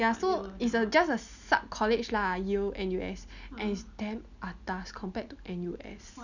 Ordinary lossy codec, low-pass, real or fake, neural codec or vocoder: none; 7.2 kHz; real; none